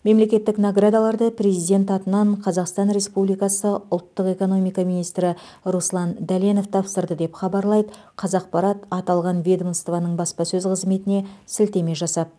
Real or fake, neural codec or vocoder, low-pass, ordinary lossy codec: real; none; none; none